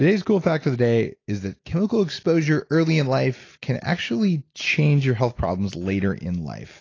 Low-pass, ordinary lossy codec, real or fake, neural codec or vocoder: 7.2 kHz; AAC, 32 kbps; real; none